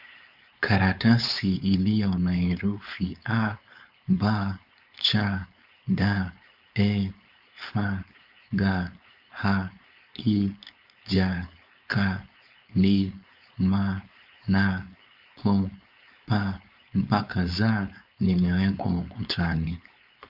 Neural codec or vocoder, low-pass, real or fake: codec, 16 kHz, 4.8 kbps, FACodec; 5.4 kHz; fake